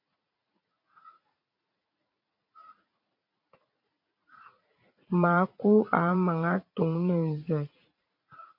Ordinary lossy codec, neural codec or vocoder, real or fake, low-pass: MP3, 32 kbps; vocoder, 44.1 kHz, 80 mel bands, Vocos; fake; 5.4 kHz